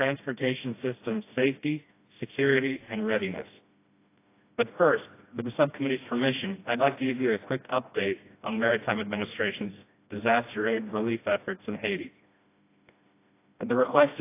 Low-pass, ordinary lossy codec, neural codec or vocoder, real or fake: 3.6 kHz; AAC, 24 kbps; codec, 16 kHz, 1 kbps, FreqCodec, smaller model; fake